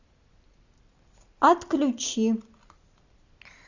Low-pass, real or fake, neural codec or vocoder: 7.2 kHz; real; none